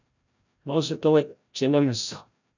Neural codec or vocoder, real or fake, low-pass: codec, 16 kHz, 0.5 kbps, FreqCodec, larger model; fake; 7.2 kHz